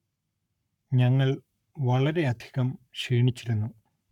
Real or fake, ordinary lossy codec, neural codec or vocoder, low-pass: fake; none; codec, 44.1 kHz, 7.8 kbps, Pupu-Codec; 19.8 kHz